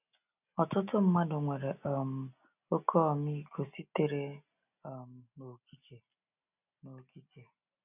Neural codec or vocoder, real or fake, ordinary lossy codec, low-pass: none; real; none; 3.6 kHz